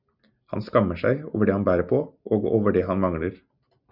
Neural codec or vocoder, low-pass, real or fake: none; 5.4 kHz; real